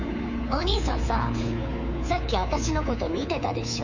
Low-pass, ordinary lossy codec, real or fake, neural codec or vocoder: 7.2 kHz; none; fake; codec, 24 kHz, 3.1 kbps, DualCodec